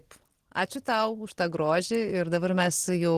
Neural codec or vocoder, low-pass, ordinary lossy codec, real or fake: none; 14.4 kHz; Opus, 16 kbps; real